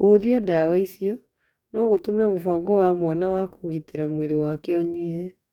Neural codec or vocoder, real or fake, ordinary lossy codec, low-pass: codec, 44.1 kHz, 2.6 kbps, DAC; fake; none; 19.8 kHz